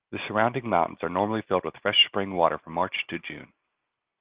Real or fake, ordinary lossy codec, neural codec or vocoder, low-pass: real; Opus, 32 kbps; none; 3.6 kHz